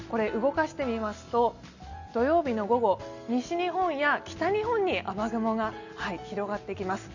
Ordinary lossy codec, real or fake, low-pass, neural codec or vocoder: none; real; 7.2 kHz; none